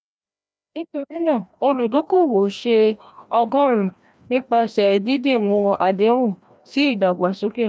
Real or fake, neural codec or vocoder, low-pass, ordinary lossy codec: fake; codec, 16 kHz, 1 kbps, FreqCodec, larger model; none; none